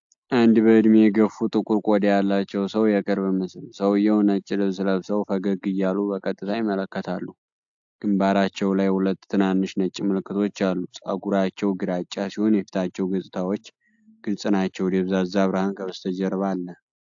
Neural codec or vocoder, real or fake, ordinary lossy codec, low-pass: none; real; AAC, 64 kbps; 7.2 kHz